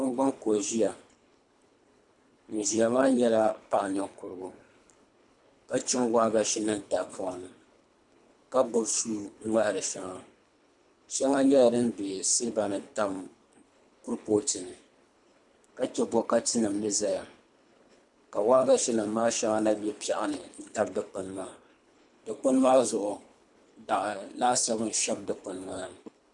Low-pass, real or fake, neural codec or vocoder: 10.8 kHz; fake; codec, 24 kHz, 3 kbps, HILCodec